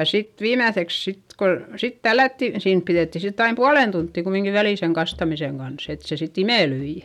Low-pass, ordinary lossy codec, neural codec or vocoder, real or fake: 19.8 kHz; none; none; real